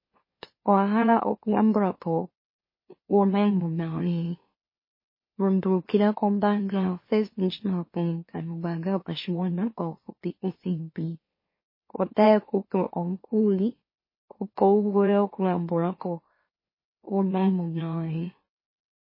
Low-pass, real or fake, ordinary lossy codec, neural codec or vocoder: 5.4 kHz; fake; MP3, 24 kbps; autoencoder, 44.1 kHz, a latent of 192 numbers a frame, MeloTTS